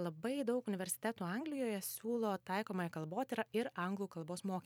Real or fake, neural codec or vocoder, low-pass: fake; vocoder, 44.1 kHz, 128 mel bands every 256 samples, BigVGAN v2; 19.8 kHz